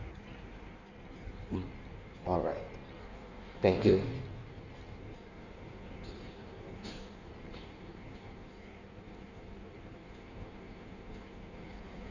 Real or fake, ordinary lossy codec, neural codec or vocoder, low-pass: fake; none; codec, 16 kHz in and 24 kHz out, 1.1 kbps, FireRedTTS-2 codec; 7.2 kHz